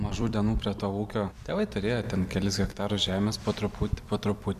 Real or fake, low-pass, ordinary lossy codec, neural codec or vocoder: fake; 14.4 kHz; AAC, 96 kbps; vocoder, 44.1 kHz, 128 mel bands every 256 samples, BigVGAN v2